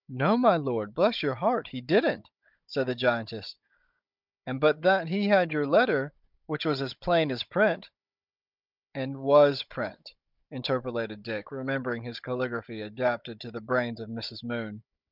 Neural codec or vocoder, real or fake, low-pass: codec, 16 kHz, 16 kbps, FunCodec, trained on Chinese and English, 50 frames a second; fake; 5.4 kHz